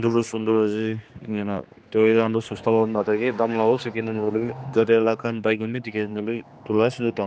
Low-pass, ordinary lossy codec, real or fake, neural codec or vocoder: none; none; fake; codec, 16 kHz, 2 kbps, X-Codec, HuBERT features, trained on general audio